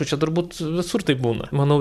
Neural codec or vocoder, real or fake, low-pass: vocoder, 44.1 kHz, 128 mel bands every 512 samples, BigVGAN v2; fake; 14.4 kHz